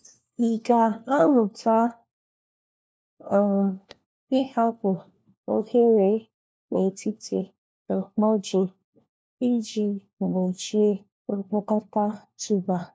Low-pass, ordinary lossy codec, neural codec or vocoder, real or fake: none; none; codec, 16 kHz, 1 kbps, FunCodec, trained on LibriTTS, 50 frames a second; fake